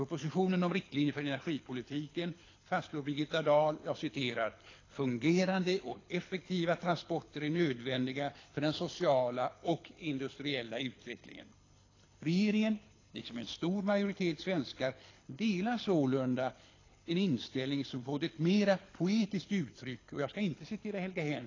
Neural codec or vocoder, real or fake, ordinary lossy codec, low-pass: codec, 24 kHz, 6 kbps, HILCodec; fake; AAC, 32 kbps; 7.2 kHz